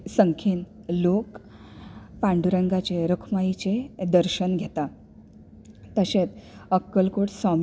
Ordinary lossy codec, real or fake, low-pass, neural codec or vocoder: none; real; none; none